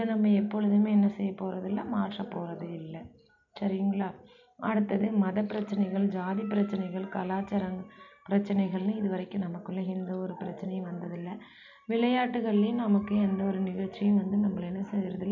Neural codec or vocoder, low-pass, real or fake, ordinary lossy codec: none; 7.2 kHz; real; none